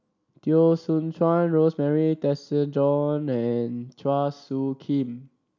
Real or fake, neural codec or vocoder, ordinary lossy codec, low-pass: real; none; none; 7.2 kHz